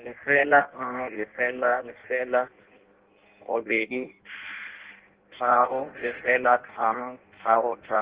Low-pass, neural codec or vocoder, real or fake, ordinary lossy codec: 3.6 kHz; codec, 16 kHz in and 24 kHz out, 0.6 kbps, FireRedTTS-2 codec; fake; Opus, 16 kbps